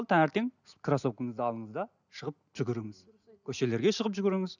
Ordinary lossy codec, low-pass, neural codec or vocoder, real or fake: none; 7.2 kHz; none; real